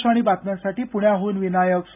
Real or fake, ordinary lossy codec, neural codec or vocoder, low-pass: real; none; none; 3.6 kHz